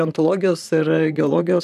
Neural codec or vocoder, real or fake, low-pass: vocoder, 44.1 kHz, 128 mel bands, Pupu-Vocoder; fake; 14.4 kHz